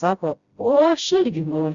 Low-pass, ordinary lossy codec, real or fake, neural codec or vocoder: 7.2 kHz; Opus, 64 kbps; fake; codec, 16 kHz, 0.5 kbps, FreqCodec, smaller model